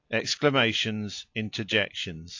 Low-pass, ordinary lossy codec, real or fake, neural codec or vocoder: 7.2 kHz; AAC, 48 kbps; real; none